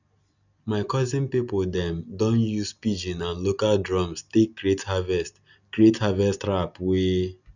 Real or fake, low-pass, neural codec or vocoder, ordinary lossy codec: real; 7.2 kHz; none; none